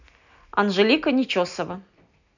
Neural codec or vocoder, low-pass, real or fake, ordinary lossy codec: none; 7.2 kHz; real; none